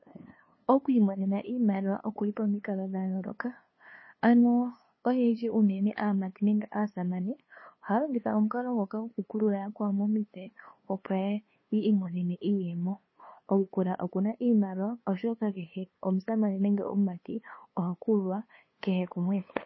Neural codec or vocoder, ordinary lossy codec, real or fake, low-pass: codec, 16 kHz, 2 kbps, FunCodec, trained on LibriTTS, 25 frames a second; MP3, 24 kbps; fake; 7.2 kHz